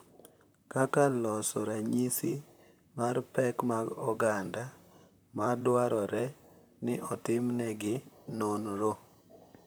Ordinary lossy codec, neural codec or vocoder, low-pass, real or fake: none; vocoder, 44.1 kHz, 128 mel bands, Pupu-Vocoder; none; fake